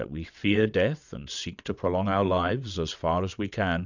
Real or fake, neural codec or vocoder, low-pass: fake; vocoder, 22.05 kHz, 80 mel bands, WaveNeXt; 7.2 kHz